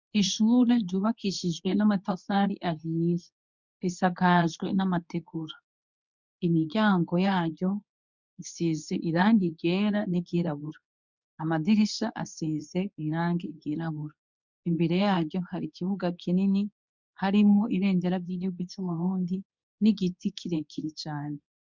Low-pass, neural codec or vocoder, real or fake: 7.2 kHz; codec, 24 kHz, 0.9 kbps, WavTokenizer, medium speech release version 2; fake